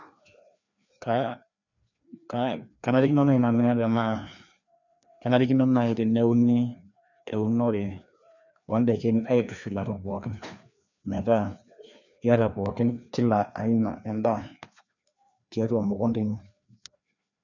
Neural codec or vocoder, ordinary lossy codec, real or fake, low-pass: codec, 16 kHz, 2 kbps, FreqCodec, larger model; none; fake; 7.2 kHz